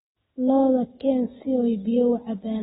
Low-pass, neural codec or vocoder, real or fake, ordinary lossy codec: 19.8 kHz; none; real; AAC, 16 kbps